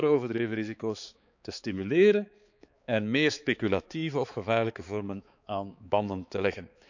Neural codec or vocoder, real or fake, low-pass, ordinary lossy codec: codec, 16 kHz, 4 kbps, X-Codec, HuBERT features, trained on balanced general audio; fake; 7.2 kHz; none